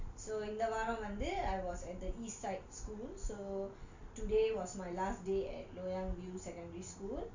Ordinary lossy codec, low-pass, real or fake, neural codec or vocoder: Opus, 64 kbps; 7.2 kHz; real; none